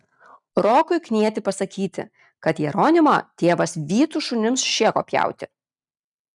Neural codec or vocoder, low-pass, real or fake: none; 10.8 kHz; real